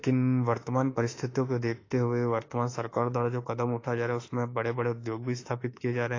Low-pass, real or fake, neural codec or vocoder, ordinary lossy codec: 7.2 kHz; fake; autoencoder, 48 kHz, 32 numbers a frame, DAC-VAE, trained on Japanese speech; AAC, 32 kbps